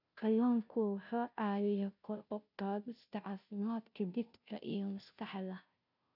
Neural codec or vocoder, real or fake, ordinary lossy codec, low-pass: codec, 16 kHz, 0.5 kbps, FunCodec, trained on Chinese and English, 25 frames a second; fake; none; 5.4 kHz